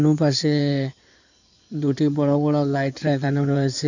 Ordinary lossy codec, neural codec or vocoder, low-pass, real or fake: none; codec, 16 kHz in and 24 kHz out, 2.2 kbps, FireRedTTS-2 codec; 7.2 kHz; fake